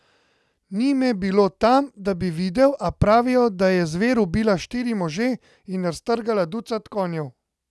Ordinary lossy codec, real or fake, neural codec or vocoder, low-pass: none; real; none; none